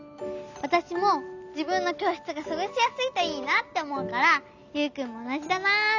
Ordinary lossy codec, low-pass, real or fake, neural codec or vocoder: none; 7.2 kHz; real; none